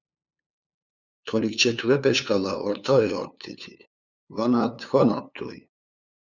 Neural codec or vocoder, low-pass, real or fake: codec, 16 kHz, 2 kbps, FunCodec, trained on LibriTTS, 25 frames a second; 7.2 kHz; fake